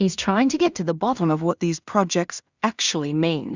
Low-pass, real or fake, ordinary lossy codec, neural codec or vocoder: 7.2 kHz; fake; Opus, 64 kbps; codec, 16 kHz in and 24 kHz out, 0.4 kbps, LongCat-Audio-Codec, two codebook decoder